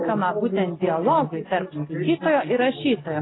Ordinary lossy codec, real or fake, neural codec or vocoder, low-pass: AAC, 16 kbps; real; none; 7.2 kHz